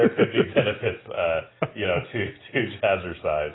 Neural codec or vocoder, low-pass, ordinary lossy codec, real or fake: none; 7.2 kHz; AAC, 16 kbps; real